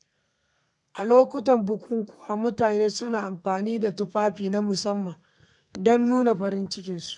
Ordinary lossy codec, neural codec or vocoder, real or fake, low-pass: none; codec, 32 kHz, 1.9 kbps, SNAC; fake; 10.8 kHz